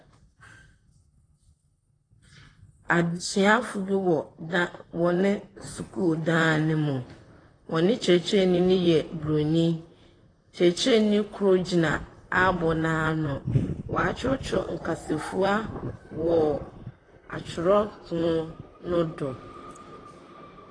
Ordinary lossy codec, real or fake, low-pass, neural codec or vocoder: AAC, 32 kbps; fake; 9.9 kHz; vocoder, 44.1 kHz, 128 mel bands, Pupu-Vocoder